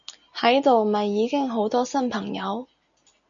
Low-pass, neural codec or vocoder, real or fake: 7.2 kHz; none; real